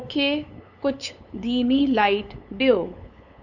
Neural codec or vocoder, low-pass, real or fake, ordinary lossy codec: codec, 16 kHz, 8 kbps, FunCodec, trained on LibriTTS, 25 frames a second; 7.2 kHz; fake; none